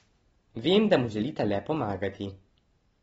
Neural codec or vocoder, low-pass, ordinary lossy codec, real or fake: none; 19.8 kHz; AAC, 24 kbps; real